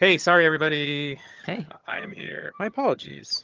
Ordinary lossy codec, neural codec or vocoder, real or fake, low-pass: Opus, 24 kbps; vocoder, 22.05 kHz, 80 mel bands, HiFi-GAN; fake; 7.2 kHz